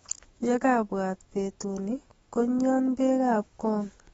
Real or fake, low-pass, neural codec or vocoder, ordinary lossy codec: fake; 19.8 kHz; autoencoder, 48 kHz, 128 numbers a frame, DAC-VAE, trained on Japanese speech; AAC, 24 kbps